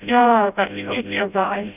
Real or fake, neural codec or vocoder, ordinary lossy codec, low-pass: fake; codec, 16 kHz, 0.5 kbps, FreqCodec, smaller model; none; 3.6 kHz